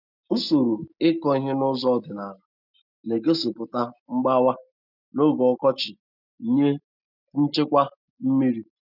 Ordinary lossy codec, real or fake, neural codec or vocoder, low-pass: none; real; none; 5.4 kHz